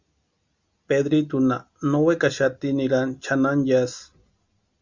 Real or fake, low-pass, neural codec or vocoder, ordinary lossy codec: real; 7.2 kHz; none; Opus, 64 kbps